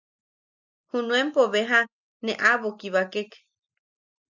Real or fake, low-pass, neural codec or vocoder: real; 7.2 kHz; none